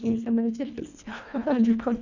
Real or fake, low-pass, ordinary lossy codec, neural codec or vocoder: fake; 7.2 kHz; none; codec, 24 kHz, 1.5 kbps, HILCodec